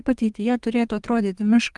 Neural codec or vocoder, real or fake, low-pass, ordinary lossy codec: codec, 32 kHz, 1.9 kbps, SNAC; fake; 10.8 kHz; Opus, 24 kbps